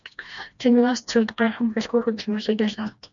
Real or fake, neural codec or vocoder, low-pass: fake; codec, 16 kHz, 1 kbps, FreqCodec, smaller model; 7.2 kHz